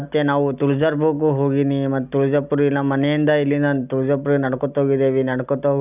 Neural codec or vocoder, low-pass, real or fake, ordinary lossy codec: none; 3.6 kHz; real; none